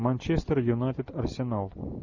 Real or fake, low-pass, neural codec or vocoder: real; 7.2 kHz; none